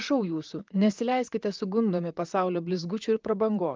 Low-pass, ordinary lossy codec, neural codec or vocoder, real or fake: 7.2 kHz; Opus, 32 kbps; vocoder, 44.1 kHz, 128 mel bands, Pupu-Vocoder; fake